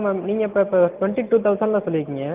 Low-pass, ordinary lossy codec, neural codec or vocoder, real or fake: 3.6 kHz; Opus, 32 kbps; none; real